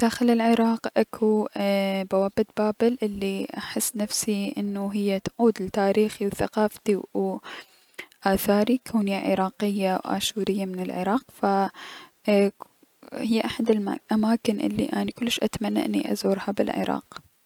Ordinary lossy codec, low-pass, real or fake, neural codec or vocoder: none; 19.8 kHz; real; none